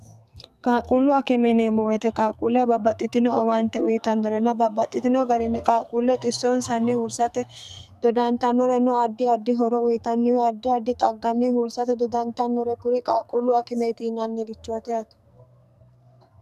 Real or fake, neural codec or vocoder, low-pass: fake; codec, 44.1 kHz, 2.6 kbps, SNAC; 14.4 kHz